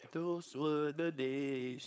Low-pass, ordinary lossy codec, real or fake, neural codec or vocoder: none; none; fake; codec, 16 kHz, 4.8 kbps, FACodec